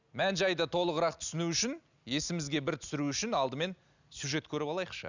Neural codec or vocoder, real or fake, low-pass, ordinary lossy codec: none; real; 7.2 kHz; none